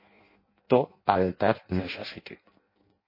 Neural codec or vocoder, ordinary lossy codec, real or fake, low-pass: codec, 16 kHz in and 24 kHz out, 0.6 kbps, FireRedTTS-2 codec; MP3, 24 kbps; fake; 5.4 kHz